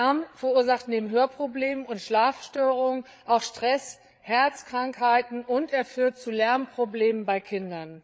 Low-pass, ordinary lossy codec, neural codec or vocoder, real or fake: none; none; codec, 16 kHz, 8 kbps, FreqCodec, larger model; fake